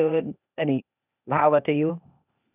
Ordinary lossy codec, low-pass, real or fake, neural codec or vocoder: none; 3.6 kHz; fake; codec, 16 kHz, 0.8 kbps, ZipCodec